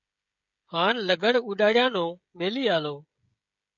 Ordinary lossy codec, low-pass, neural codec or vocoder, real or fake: MP3, 64 kbps; 7.2 kHz; codec, 16 kHz, 16 kbps, FreqCodec, smaller model; fake